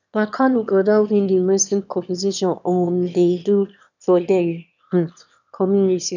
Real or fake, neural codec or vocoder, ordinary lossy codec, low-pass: fake; autoencoder, 22.05 kHz, a latent of 192 numbers a frame, VITS, trained on one speaker; none; 7.2 kHz